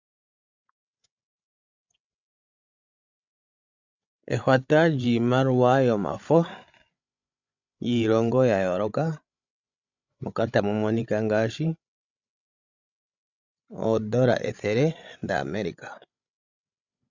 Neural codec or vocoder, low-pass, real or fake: codec, 16 kHz, 16 kbps, FreqCodec, larger model; 7.2 kHz; fake